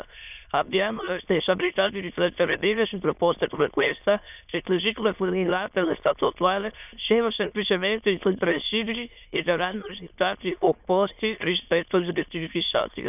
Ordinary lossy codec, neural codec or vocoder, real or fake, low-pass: none; autoencoder, 22.05 kHz, a latent of 192 numbers a frame, VITS, trained on many speakers; fake; 3.6 kHz